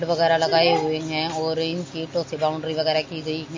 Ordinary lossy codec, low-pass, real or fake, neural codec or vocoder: MP3, 32 kbps; 7.2 kHz; real; none